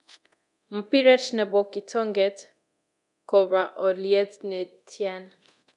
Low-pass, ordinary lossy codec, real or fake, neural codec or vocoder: 10.8 kHz; none; fake; codec, 24 kHz, 0.9 kbps, DualCodec